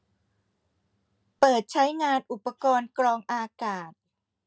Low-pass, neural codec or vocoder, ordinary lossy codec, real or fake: none; none; none; real